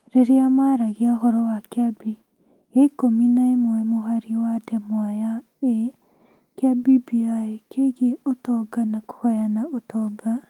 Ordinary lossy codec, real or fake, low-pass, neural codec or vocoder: Opus, 24 kbps; fake; 19.8 kHz; autoencoder, 48 kHz, 128 numbers a frame, DAC-VAE, trained on Japanese speech